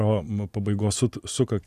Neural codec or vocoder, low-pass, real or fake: none; 14.4 kHz; real